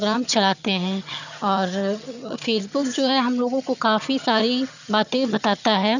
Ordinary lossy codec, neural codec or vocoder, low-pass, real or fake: none; vocoder, 22.05 kHz, 80 mel bands, HiFi-GAN; 7.2 kHz; fake